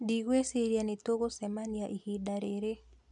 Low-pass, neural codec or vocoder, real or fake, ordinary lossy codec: 10.8 kHz; none; real; none